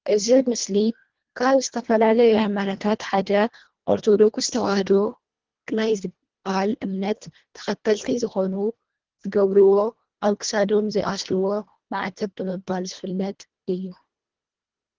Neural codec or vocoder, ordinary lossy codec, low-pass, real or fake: codec, 24 kHz, 1.5 kbps, HILCodec; Opus, 16 kbps; 7.2 kHz; fake